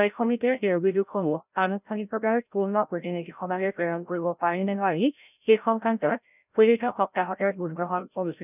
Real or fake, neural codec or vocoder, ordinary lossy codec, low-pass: fake; codec, 16 kHz, 0.5 kbps, FreqCodec, larger model; none; 3.6 kHz